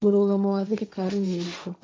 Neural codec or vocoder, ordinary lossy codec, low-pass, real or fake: codec, 16 kHz, 1.1 kbps, Voila-Tokenizer; none; none; fake